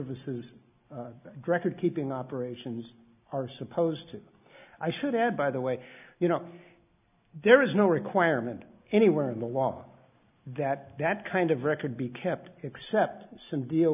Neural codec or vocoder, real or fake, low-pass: none; real; 3.6 kHz